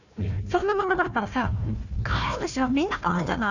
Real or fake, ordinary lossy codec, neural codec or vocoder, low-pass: fake; none; codec, 16 kHz, 1 kbps, FunCodec, trained on Chinese and English, 50 frames a second; 7.2 kHz